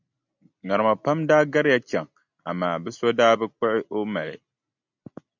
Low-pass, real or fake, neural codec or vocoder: 7.2 kHz; real; none